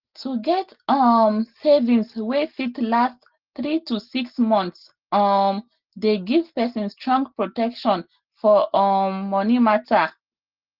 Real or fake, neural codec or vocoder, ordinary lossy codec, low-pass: real; none; Opus, 16 kbps; 5.4 kHz